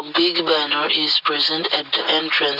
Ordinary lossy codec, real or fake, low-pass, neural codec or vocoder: Opus, 64 kbps; real; 5.4 kHz; none